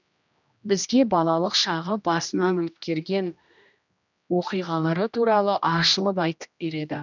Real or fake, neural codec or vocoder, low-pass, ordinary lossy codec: fake; codec, 16 kHz, 1 kbps, X-Codec, HuBERT features, trained on general audio; 7.2 kHz; none